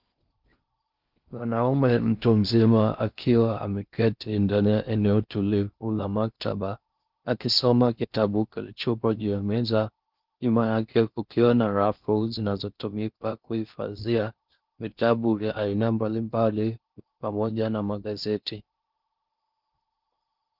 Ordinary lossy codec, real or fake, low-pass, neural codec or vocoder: Opus, 24 kbps; fake; 5.4 kHz; codec, 16 kHz in and 24 kHz out, 0.6 kbps, FocalCodec, streaming, 4096 codes